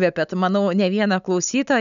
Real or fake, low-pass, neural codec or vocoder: real; 7.2 kHz; none